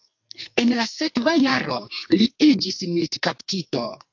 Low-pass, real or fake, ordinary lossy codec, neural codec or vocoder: 7.2 kHz; fake; AAC, 48 kbps; codec, 44.1 kHz, 2.6 kbps, SNAC